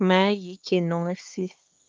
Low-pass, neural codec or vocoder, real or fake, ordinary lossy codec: 7.2 kHz; codec, 16 kHz, 2 kbps, X-Codec, HuBERT features, trained on LibriSpeech; fake; Opus, 32 kbps